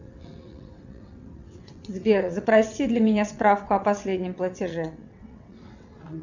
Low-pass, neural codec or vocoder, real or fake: 7.2 kHz; vocoder, 22.05 kHz, 80 mel bands, WaveNeXt; fake